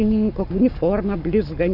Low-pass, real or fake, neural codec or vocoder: 5.4 kHz; fake; codec, 44.1 kHz, 7.8 kbps, Pupu-Codec